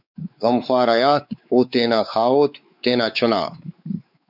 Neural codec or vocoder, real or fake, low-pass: codec, 16 kHz, 4 kbps, X-Codec, WavLM features, trained on Multilingual LibriSpeech; fake; 5.4 kHz